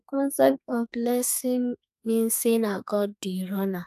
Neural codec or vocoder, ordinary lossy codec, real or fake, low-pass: codec, 32 kHz, 1.9 kbps, SNAC; none; fake; 14.4 kHz